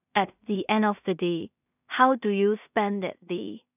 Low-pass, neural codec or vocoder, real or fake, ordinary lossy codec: 3.6 kHz; codec, 16 kHz in and 24 kHz out, 0.4 kbps, LongCat-Audio-Codec, two codebook decoder; fake; none